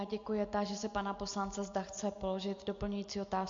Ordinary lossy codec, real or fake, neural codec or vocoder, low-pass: MP3, 48 kbps; real; none; 7.2 kHz